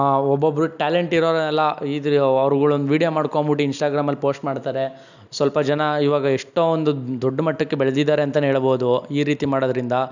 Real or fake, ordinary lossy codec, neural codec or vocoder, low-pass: real; none; none; 7.2 kHz